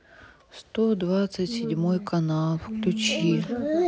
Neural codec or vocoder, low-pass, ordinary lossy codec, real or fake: none; none; none; real